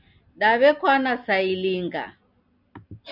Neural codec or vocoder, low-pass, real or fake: none; 5.4 kHz; real